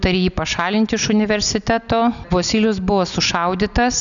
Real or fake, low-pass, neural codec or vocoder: real; 7.2 kHz; none